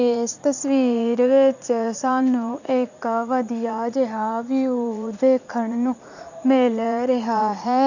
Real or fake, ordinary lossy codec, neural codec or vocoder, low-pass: fake; none; vocoder, 44.1 kHz, 80 mel bands, Vocos; 7.2 kHz